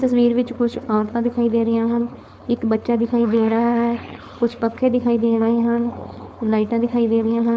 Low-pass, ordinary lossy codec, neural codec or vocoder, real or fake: none; none; codec, 16 kHz, 4.8 kbps, FACodec; fake